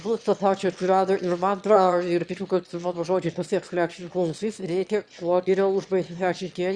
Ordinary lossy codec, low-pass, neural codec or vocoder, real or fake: Opus, 64 kbps; 9.9 kHz; autoencoder, 22.05 kHz, a latent of 192 numbers a frame, VITS, trained on one speaker; fake